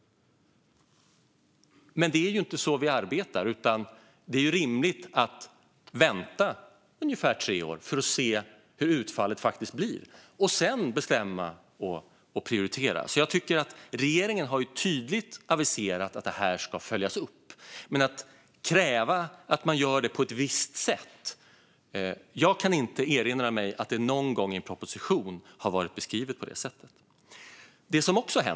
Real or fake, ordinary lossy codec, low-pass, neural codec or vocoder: real; none; none; none